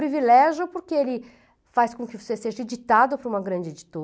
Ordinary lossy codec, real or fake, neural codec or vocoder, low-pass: none; real; none; none